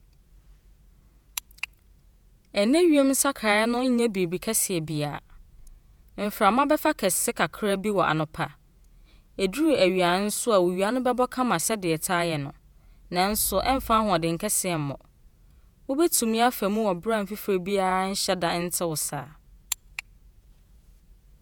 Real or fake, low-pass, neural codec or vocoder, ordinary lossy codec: fake; none; vocoder, 48 kHz, 128 mel bands, Vocos; none